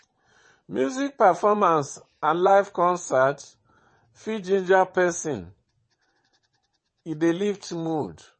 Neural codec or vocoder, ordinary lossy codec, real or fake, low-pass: vocoder, 22.05 kHz, 80 mel bands, WaveNeXt; MP3, 32 kbps; fake; 9.9 kHz